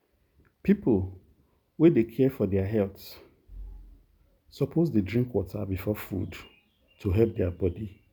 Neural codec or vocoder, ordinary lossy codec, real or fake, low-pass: none; none; real; none